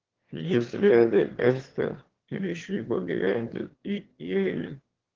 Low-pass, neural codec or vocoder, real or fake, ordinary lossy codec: 7.2 kHz; autoencoder, 22.05 kHz, a latent of 192 numbers a frame, VITS, trained on one speaker; fake; Opus, 16 kbps